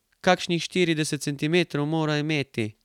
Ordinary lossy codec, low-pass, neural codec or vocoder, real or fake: none; 19.8 kHz; none; real